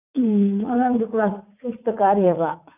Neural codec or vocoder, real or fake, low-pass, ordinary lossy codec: vocoder, 44.1 kHz, 80 mel bands, Vocos; fake; 3.6 kHz; none